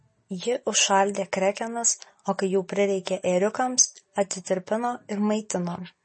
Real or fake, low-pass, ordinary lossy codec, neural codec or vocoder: real; 10.8 kHz; MP3, 32 kbps; none